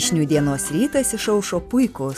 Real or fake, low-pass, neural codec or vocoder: real; 14.4 kHz; none